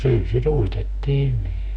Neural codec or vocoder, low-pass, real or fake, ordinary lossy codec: autoencoder, 48 kHz, 32 numbers a frame, DAC-VAE, trained on Japanese speech; 9.9 kHz; fake; none